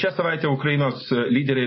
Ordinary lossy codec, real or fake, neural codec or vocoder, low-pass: MP3, 24 kbps; real; none; 7.2 kHz